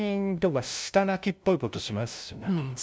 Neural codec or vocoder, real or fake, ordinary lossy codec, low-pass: codec, 16 kHz, 0.5 kbps, FunCodec, trained on LibriTTS, 25 frames a second; fake; none; none